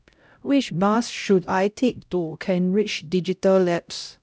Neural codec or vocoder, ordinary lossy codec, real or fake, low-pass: codec, 16 kHz, 0.5 kbps, X-Codec, HuBERT features, trained on LibriSpeech; none; fake; none